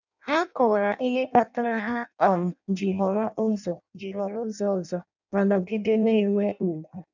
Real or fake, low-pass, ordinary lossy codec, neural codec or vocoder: fake; 7.2 kHz; none; codec, 16 kHz in and 24 kHz out, 0.6 kbps, FireRedTTS-2 codec